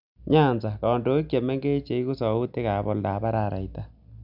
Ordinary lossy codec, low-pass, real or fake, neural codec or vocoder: none; 5.4 kHz; real; none